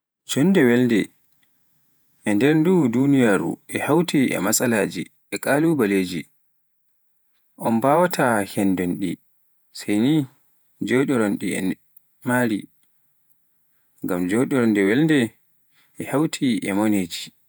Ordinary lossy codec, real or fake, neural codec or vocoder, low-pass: none; fake; vocoder, 48 kHz, 128 mel bands, Vocos; none